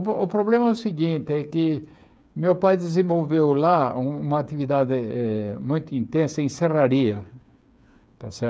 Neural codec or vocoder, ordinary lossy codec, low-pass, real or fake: codec, 16 kHz, 8 kbps, FreqCodec, smaller model; none; none; fake